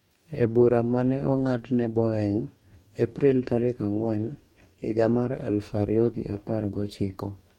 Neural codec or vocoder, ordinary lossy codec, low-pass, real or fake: codec, 44.1 kHz, 2.6 kbps, DAC; MP3, 64 kbps; 19.8 kHz; fake